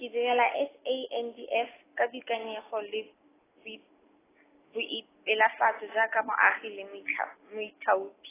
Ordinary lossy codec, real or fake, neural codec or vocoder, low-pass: AAC, 16 kbps; real; none; 3.6 kHz